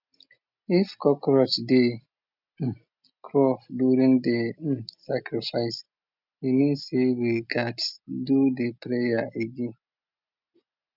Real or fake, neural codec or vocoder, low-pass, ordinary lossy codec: real; none; 5.4 kHz; none